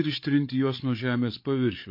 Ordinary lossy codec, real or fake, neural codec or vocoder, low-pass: MP3, 32 kbps; fake; codec, 16 kHz, 8 kbps, FreqCodec, larger model; 5.4 kHz